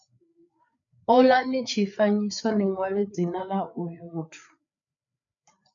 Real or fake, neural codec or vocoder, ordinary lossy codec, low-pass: fake; codec, 16 kHz, 4 kbps, FreqCodec, larger model; MP3, 96 kbps; 7.2 kHz